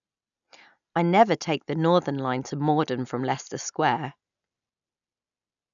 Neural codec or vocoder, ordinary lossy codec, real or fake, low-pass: none; none; real; 7.2 kHz